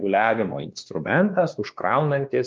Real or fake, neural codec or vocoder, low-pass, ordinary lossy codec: fake; codec, 16 kHz, 2 kbps, X-Codec, WavLM features, trained on Multilingual LibriSpeech; 7.2 kHz; Opus, 32 kbps